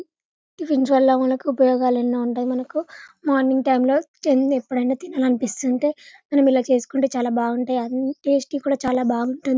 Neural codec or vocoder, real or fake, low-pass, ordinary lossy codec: none; real; none; none